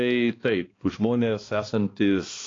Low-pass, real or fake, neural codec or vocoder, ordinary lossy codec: 7.2 kHz; fake; codec, 16 kHz, 2 kbps, X-Codec, HuBERT features, trained on balanced general audio; AAC, 32 kbps